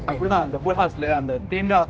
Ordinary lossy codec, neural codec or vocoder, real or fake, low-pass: none; codec, 16 kHz, 1 kbps, X-Codec, HuBERT features, trained on general audio; fake; none